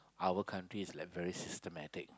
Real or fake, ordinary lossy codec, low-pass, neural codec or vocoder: real; none; none; none